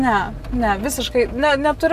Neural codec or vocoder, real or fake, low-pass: none; real; 14.4 kHz